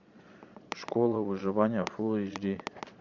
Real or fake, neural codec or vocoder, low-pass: fake; vocoder, 22.05 kHz, 80 mel bands, Vocos; 7.2 kHz